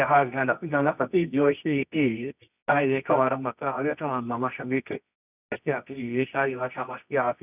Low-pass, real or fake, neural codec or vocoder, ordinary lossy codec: 3.6 kHz; fake; codec, 24 kHz, 0.9 kbps, WavTokenizer, medium music audio release; none